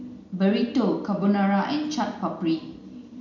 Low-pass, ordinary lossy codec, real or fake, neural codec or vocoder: 7.2 kHz; none; real; none